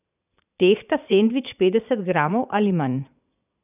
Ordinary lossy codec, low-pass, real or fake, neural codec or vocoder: none; 3.6 kHz; fake; vocoder, 22.05 kHz, 80 mel bands, Vocos